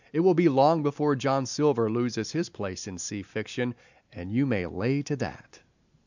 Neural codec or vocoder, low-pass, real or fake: none; 7.2 kHz; real